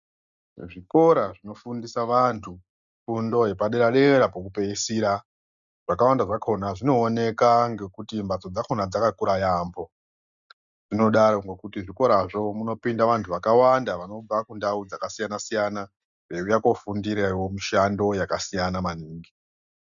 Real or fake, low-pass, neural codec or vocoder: real; 7.2 kHz; none